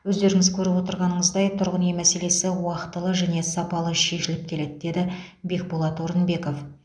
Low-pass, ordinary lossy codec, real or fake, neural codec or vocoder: 9.9 kHz; none; real; none